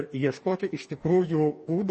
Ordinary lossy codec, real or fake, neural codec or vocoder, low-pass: MP3, 32 kbps; fake; codec, 44.1 kHz, 2.6 kbps, DAC; 10.8 kHz